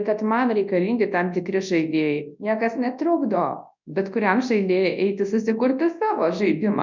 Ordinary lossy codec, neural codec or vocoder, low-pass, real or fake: MP3, 64 kbps; codec, 24 kHz, 0.9 kbps, WavTokenizer, large speech release; 7.2 kHz; fake